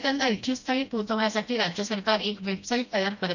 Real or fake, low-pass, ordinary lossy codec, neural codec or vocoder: fake; 7.2 kHz; none; codec, 16 kHz, 1 kbps, FreqCodec, smaller model